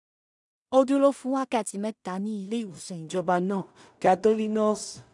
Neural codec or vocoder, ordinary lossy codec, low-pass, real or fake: codec, 16 kHz in and 24 kHz out, 0.4 kbps, LongCat-Audio-Codec, two codebook decoder; MP3, 96 kbps; 10.8 kHz; fake